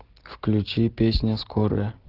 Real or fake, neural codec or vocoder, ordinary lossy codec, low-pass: real; none; Opus, 16 kbps; 5.4 kHz